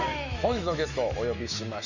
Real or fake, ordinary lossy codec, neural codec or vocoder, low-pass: fake; none; autoencoder, 48 kHz, 128 numbers a frame, DAC-VAE, trained on Japanese speech; 7.2 kHz